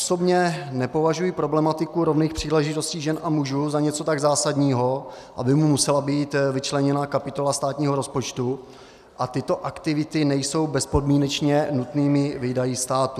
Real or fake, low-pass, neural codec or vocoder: real; 14.4 kHz; none